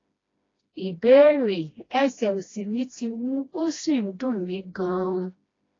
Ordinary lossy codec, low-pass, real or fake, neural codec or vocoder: AAC, 32 kbps; 7.2 kHz; fake; codec, 16 kHz, 1 kbps, FreqCodec, smaller model